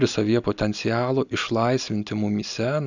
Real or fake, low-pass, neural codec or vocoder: real; 7.2 kHz; none